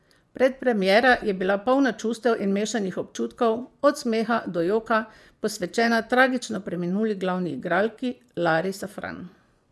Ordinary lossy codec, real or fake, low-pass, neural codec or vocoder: none; fake; none; vocoder, 24 kHz, 100 mel bands, Vocos